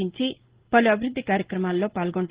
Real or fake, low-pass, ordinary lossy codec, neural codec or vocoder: real; 3.6 kHz; Opus, 16 kbps; none